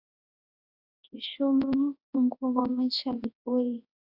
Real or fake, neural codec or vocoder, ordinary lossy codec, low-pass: fake; codec, 24 kHz, 0.9 kbps, WavTokenizer, large speech release; AAC, 48 kbps; 5.4 kHz